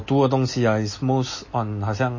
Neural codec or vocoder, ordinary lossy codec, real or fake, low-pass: none; MP3, 32 kbps; real; 7.2 kHz